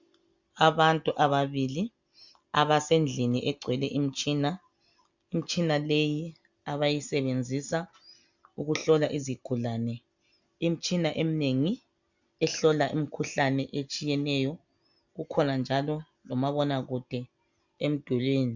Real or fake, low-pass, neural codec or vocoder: real; 7.2 kHz; none